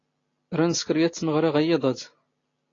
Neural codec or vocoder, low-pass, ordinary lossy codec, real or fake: none; 7.2 kHz; AAC, 32 kbps; real